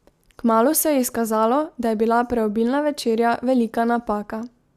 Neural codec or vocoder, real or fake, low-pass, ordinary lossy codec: none; real; 14.4 kHz; Opus, 64 kbps